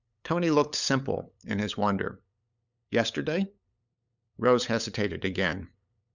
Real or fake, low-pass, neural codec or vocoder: fake; 7.2 kHz; codec, 16 kHz, 8 kbps, FunCodec, trained on LibriTTS, 25 frames a second